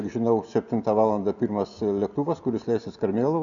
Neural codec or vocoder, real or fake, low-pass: none; real; 7.2 kHz